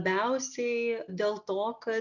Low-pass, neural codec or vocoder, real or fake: 7.2 kHz; none; real